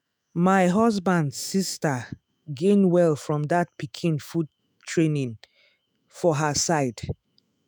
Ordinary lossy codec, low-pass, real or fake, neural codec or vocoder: none; none; fake; autoencoder, 48 kHz, 128 numbers a frame, DAC-VAE, trained on Japanese speech